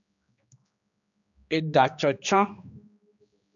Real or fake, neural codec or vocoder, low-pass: fake; codec, 16 kHz, 2 kbps, X-Codec, HuBERT features, trained on general audio; 7.2 kHz